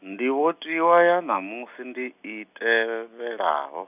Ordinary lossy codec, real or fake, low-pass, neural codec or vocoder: none; real; 3.6 kHz; none